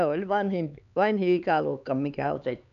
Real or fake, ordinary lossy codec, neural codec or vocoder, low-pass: fake; none; codec, 16 kHz, 4 kbps, X-Codec, HuBERT features, trained on LibriSpeech; 7.2 kHz